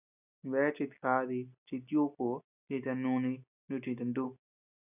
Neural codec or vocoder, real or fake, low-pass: none; real; 3.6 kHz